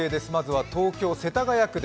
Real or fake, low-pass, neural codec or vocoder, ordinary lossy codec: real; none; none; none